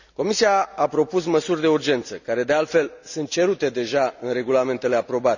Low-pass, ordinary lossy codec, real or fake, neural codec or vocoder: 7.2 kHz; none; real; none